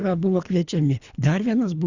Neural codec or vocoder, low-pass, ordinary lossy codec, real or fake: codec, 24 kHz, 3 kbps, HILCodec; 7.2 kHz; Opus, 64 kbps; fake